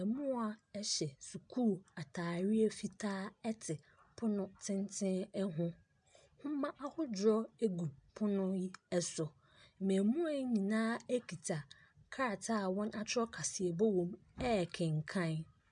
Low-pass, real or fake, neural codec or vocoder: 9.9 kHz; real; none